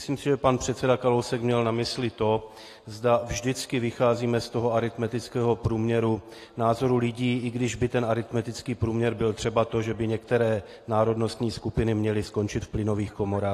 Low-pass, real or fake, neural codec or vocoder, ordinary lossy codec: 14.4 kHz; real; none; AAC, 48 kbps